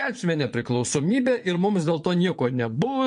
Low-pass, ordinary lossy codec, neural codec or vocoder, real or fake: 9.9 kHz; MP3, 48 kbps; vocoder, 22.05 kHz, 80 mel bands, Vocos; fake